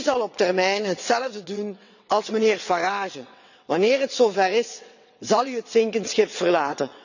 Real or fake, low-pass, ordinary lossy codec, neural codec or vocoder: fake; 7.2 kHz; none; vocoder, 22.05 kHz, 80 mel bands, WaveNeXt